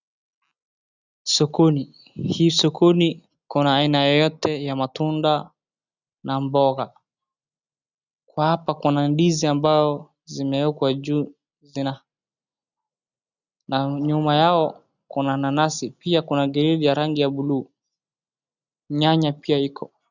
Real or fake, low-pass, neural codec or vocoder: real; 7.2 kHz; none